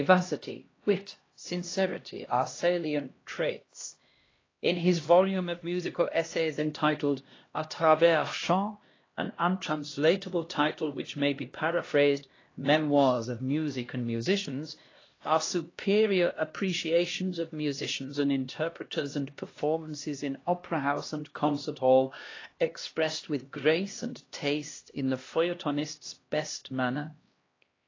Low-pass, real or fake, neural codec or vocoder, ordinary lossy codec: 7.2 kHz; fake; codec, 16 kHz, 1 kbps, X-Codec, HuBERT features, trained on LibriSpeech; AAC, 32 kbps